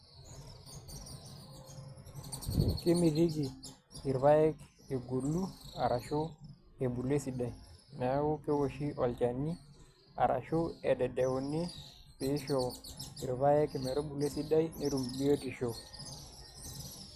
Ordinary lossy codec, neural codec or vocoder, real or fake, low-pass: Opus, 64 kbps; none; real; 14.4 kHz